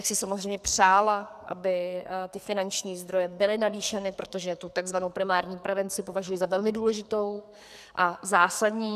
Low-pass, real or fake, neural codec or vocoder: 14.4 kHz; fake; codec, 44.1 kHz, 2.6 kbps, SNAC